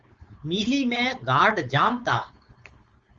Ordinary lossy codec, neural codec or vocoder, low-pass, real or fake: Opus, 32 kbps; codec, 16 kHz, 4.8 kbps, FACodec; 7.2 kHz; fake